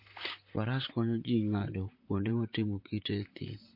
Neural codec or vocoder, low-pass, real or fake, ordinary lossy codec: vocoder, 44.1 kHz, 128 mel bands, Pupu-Vocoder; 5.4 kHz; fake; MP3, 32 kbps